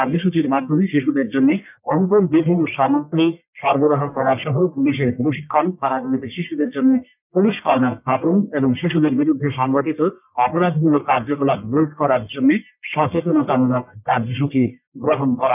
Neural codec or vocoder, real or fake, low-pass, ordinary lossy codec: codec, 44.1 kHz, 1.7 kbps, Pupu-Codec; fake; 3.6 kHz; none